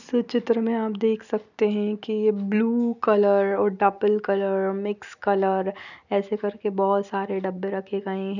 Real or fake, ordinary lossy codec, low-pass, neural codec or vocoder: real; none; 7.2 kHz; none